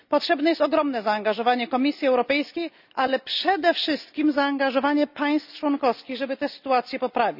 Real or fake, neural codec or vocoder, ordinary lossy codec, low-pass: real; none; none; 5.4 kHz